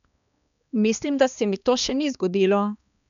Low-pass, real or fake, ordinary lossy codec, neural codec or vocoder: 7.2 kHz; fake; none; codec, 16 kHz, 2 kbps, X-Codec, HuBERT features, trained on balanced general audio